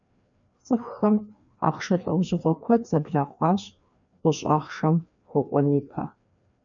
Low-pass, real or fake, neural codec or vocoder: 7.2 kHz; fake; codec, 16 kHz, 2 kbps, FreqCodec, larger model